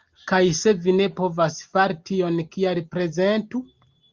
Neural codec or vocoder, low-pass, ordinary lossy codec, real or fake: none; 7.2 kHz; Opus, 32 kbps; real